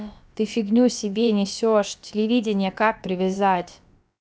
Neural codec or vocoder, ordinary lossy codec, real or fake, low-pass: codec, 16 kHz, about 1 kbps, DyCAST, with the encoder's durations; none; fake; none